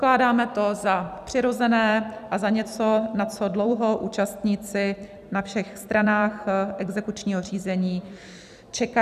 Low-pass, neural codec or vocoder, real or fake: 14.4 kHz; vocoder, 44.1 kHz, 128 mel bands every 256 samples, BigVGAN v2; fake